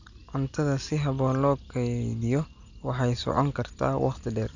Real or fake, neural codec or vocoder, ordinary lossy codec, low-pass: real; none; none; 7.2 kHz